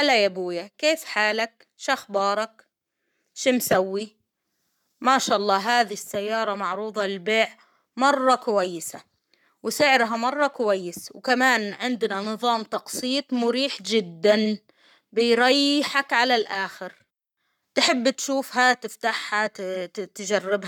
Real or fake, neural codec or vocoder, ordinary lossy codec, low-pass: fake; codec, 44.1 kHz, 7.8 kbps, Pupu-Codec; none; 19.8 kHz